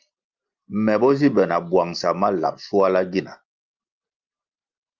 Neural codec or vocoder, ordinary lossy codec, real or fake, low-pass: none; Opus, 24 kbps; real; 7.2 kHz